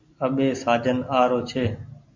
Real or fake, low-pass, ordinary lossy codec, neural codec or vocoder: real; 7.2 kHz; MP3, 48 kbps; none